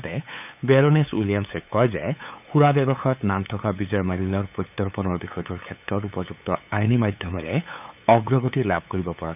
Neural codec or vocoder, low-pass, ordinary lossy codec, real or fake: codec, 16 kHz, 8 kbps, FunCodec, trained on LibriTTS, 25 frames a second; 3.6 kHz; none; fake